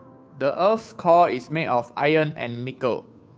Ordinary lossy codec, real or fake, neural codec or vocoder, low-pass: none; fake; codec, 16 kHz, 2 kbps, FunCodec, trained on Chinese and English, 25 frames a second; none